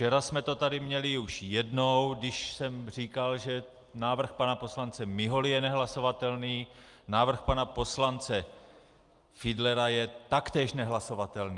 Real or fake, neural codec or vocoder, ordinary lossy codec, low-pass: real; none; Opus, 32 kbps; 10.8 kHz